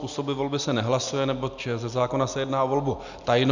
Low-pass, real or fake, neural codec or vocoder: 7.2 kHz; real; none